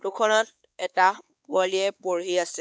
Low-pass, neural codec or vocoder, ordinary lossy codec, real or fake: none; codec, 16 kHz, 4 kbps, X-Codec, WavLM features, trained on Multilingual LibriSpeech; none; fake